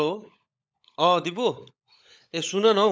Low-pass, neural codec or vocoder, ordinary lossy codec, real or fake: none; codec, 16 kHz, 16 kbps, FunCodec, trained on LibriTTS, 50 frames a second; none; fake